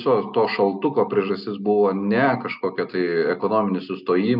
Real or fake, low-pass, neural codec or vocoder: real; 5.4 kHz; none